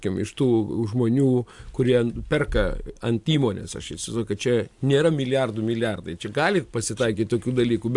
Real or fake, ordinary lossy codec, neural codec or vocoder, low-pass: real; AAC, 64 kbps; none; 10.8 kHz